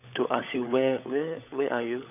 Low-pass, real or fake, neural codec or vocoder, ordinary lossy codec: 3.6 kHz; fake; codec, 16 kHz, 16 kbps, FunCodec, trained on LibriTTS, 50 frames a second; none